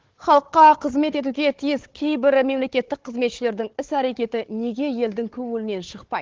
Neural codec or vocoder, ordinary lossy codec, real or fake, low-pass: codec, 16 kHz, 4 kbps, FunCodec, trained on Chinese and English, 50 frames a second; Opus, 16 kbps; fake; 7.2 kHz